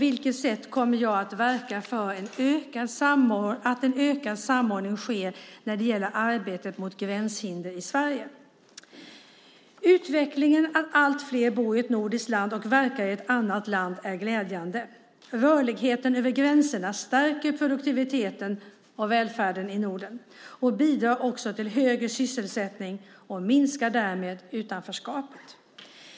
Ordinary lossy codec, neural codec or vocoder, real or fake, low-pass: none; none; real; none